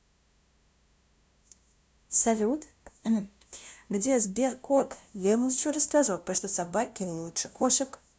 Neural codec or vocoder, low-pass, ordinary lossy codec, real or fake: codec, 16 kHz, 0.5 kbps, FunCodec, trained on LibriTTS, 25 frames a second; none; none; fake